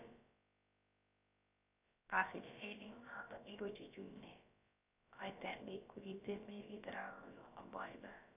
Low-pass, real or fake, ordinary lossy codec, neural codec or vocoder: 3.6 kHz; fake; none; codec, 16 kHz, about 1 kbps, DyCAST, with the encoder's durations